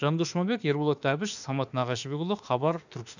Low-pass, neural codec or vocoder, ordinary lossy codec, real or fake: 7.2 kHz; autoencoder, 48 kHz, 32 numbers a frame, DAC-VAE, trained on Japanese speech; none; fake